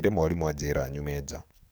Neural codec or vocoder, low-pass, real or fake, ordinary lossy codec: codec, 44.1 kHz, 7.8 kbps, DAC; none; fake; none